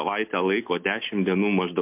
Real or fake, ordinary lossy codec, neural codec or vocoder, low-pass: real; MP3, 32 kbps; none; 3.6 kHz